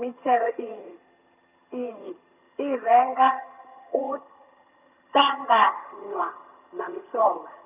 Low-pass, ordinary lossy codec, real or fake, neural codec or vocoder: 3.6 kHz; none; fake; vocoder, 22.05 kHz, 80 mel bands, HiFi-GAN